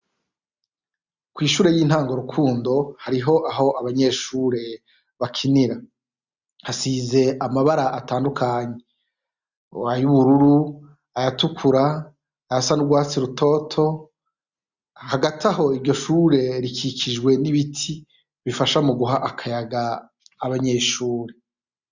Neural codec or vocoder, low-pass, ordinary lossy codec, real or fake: none; 7.2 kHz; Opus, 64 kbps; real